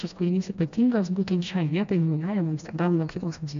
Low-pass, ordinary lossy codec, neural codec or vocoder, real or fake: 7.2 kHz; AAC, 64 kbps; codec, 16 kHz, 1 kbps, FreqCodec, smaller model; fake